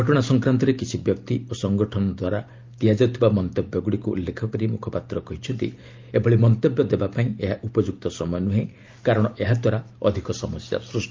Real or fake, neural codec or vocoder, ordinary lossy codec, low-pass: real; none; Opus, 24 kbps; 7.2 kHz